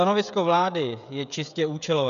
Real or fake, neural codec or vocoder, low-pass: fake; codec, 16 kHz, 16 kbps, FreqCodec, smaller model; 7.2 kHz